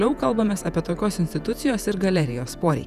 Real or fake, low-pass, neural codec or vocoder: fake; 14.4 kHz; vocoder, 48 kHz, 128 mel bands, Vocos